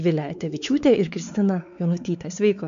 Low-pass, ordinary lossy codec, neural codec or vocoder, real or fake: 7.2 kHz; MP3, 64 kbps; codec, 16 kHz, 4 kbps, X-Codec, WavLM features, trained on Multilingual LibriSpeech; fake